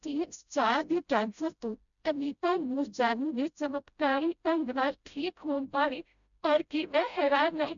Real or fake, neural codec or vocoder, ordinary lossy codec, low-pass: fake; codec, 16 kHz, 0.5 kbps, FreqCodec, smaller model; none; 7.2 kHz